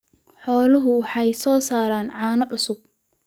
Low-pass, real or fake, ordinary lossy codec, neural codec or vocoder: none; fake; none; codec, 44.1 kHz, 7.8 kbps, DAC